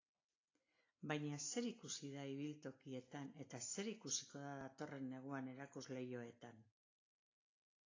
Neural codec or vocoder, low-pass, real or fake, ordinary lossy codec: none; 7.2 kHz; real; AAC, 32 kbps